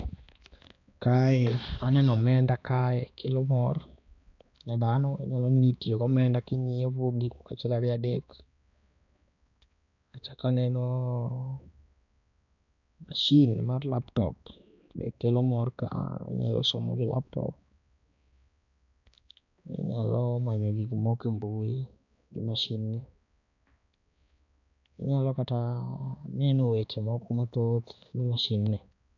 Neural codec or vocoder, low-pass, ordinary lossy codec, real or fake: codec, 16 kHz, 2 kbps, X-Codec, HuBERT features, trained on balanced general audio; 7.2 kHz; none; fake